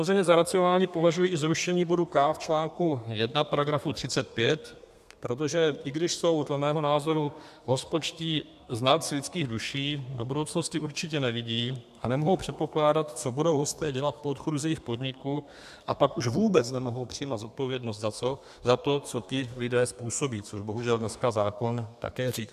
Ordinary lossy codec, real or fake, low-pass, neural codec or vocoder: AAC, 96 kbps; fake; 14.4 kHz; codec, 32 kHz, 1.9 kbps, SNAC